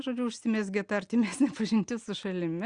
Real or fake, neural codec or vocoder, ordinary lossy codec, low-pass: real; none; Opus, 64 kbps; 9.9 kHz